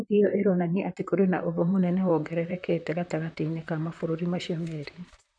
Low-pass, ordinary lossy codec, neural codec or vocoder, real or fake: 9.9 kHz; none; vocoder, 44.1 kHz, 128 mel bands, Pupu-Vocoder; fake